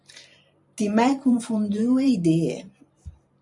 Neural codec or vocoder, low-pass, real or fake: vocoder, 44.1 kHz, 128 mel bands every 512 samples, BigVGAN v2; 10.8 kHz; fake